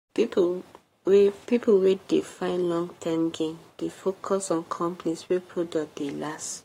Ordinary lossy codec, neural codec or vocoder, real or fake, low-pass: AAC, 48 kbps; codec, 44.1 kHz, 7.8 kbps, Pupu-Codec; fake; 19.8 kHz